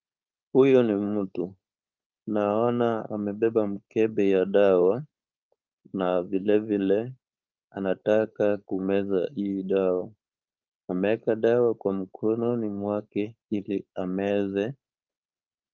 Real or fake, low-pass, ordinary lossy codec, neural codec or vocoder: fake; 7.2 kHz; Opus, 24 kbps; codec, 16 kHz, 4.8 kbps, FACodec